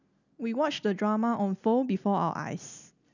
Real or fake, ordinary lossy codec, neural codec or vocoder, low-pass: real; none; none; 7.2 kHz